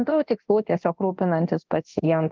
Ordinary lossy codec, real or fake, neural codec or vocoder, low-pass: Opus, 24 kbps; fake; codec, 24 kHz, 0.9 kbps, DualCodec; 7.2 kHz